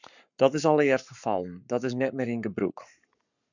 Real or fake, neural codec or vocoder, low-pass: fake; codec, 44.1 kHz, 7.8 kbps, Pupu-Codec; 7.2 kHz